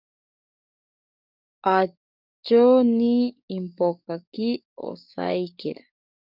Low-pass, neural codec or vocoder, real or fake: 5.4 kHz; codec, 44.1 kHz, 7.8 kbps, DAC; fake